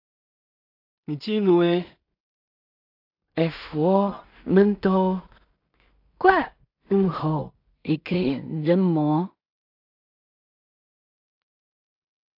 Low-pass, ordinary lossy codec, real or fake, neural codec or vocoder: 5.4 kHz; AAC, 48 kbps; fake; codec, 16 kHz in and 24 kHz out, 0.4 kbps, LongCat-Audio-Codec, two codebook decoder